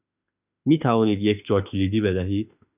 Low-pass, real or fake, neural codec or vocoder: 3.6 kHz; fake; autoencoder, 48 kHz, 32 numbers a frame, DAC-VAE, trained on Japanese speech